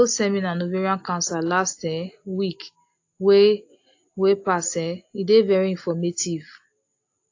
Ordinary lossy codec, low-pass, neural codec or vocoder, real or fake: AAC, 48 kbps; 7.2 kHz; none; real